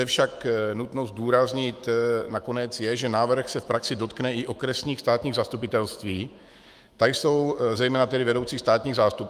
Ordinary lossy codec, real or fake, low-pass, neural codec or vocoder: Opus, 32 kbps; fake; 14.4 kHz; autoencoder, 48 kHz, 128 numbers a frame, DAC-VAE, trained on Japanese speech